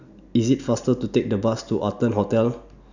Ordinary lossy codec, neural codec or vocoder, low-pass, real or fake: none; none; 7.2 kHz; real